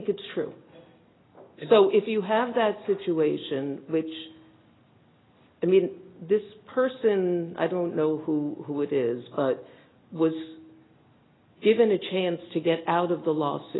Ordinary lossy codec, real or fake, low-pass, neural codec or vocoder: AAC, 16 kbps; real; 7.2 kHz; none